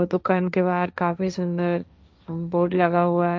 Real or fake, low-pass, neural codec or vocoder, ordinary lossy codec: fake; none; codec, 16 kHz, 1.1 kbps, Voila-Tokenizer; none